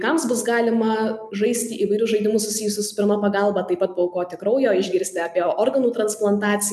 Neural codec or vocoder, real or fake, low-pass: none; real; 14.4 kHz